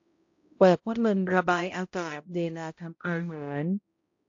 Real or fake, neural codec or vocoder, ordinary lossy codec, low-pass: fake; codec, 16 kHz, 0.5 kbps, X-Codec, HuBERT features, trained on balanced general audio; AAC, 48 kbps; 7.2 kHz